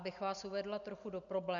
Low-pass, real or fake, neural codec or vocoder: 7.2 kHz; real; none